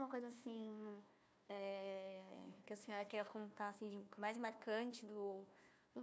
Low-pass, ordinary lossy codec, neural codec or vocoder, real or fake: none; none; codec, 16 kHz, 1 kbps, FunCodec, trained on Chinese and English, 50 frames a second; fake